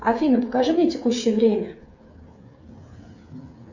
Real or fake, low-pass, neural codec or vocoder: fake; 7.2 kHz; codec, 16 kHz, 8 kbps, FreqCodec, smaller model